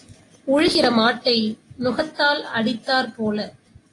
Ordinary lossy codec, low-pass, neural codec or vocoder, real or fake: AAC, 32 kbps; 10.8 kHz; none; real